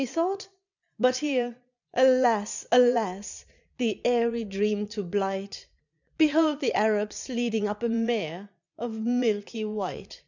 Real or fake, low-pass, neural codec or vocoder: fake; 7.2 kHz; vocoder, 22.05 kHz, 80 mel bands, Vocos